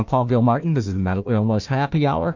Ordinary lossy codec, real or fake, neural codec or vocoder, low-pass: MP3, 48 kbps; fake; codec, 16 kHz, 1 kbps, FunCodec, trained on Chinese and English, 50 frames a second; 7.2 kHz